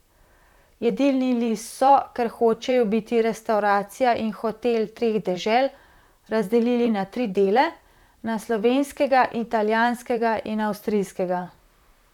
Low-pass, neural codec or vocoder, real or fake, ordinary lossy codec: 19.8 kHz; vocoder, 44.1 kHz, 128 mel bands, Pupu-Vocoder; fake; none